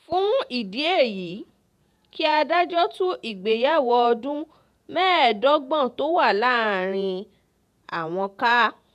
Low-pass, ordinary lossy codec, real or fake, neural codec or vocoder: 14.4 kHz; none; fake; vocoder, 48 kHz, 128 mel bands, Vocos